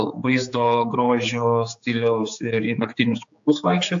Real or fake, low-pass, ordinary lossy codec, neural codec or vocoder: fake; 7.2 kHz; AAC, 64 kbps; codec, 16 kHz, 4 kbps, X-Codec, HuBERT features, trained on general audio